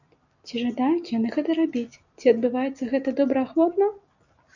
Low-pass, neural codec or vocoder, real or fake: 7.2 kHz; none; real